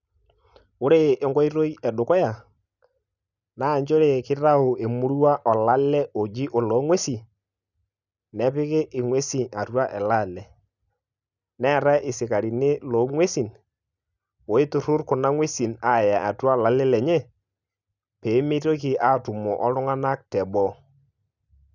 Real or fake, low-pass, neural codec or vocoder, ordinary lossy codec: real; 7.2 kHz; none; none